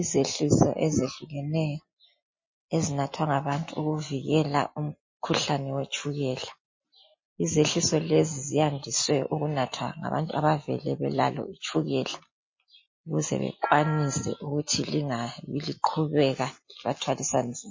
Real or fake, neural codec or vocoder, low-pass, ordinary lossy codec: real; none; 7.2 kHz; MP3, 32 kbps